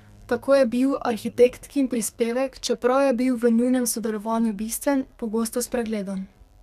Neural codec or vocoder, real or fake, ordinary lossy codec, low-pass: codec, 32 kHz, 1.9 kbps, SNAC; fake; none; 14.4 kHz